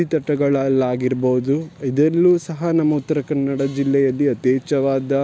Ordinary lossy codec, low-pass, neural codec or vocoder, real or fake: none; none; none; real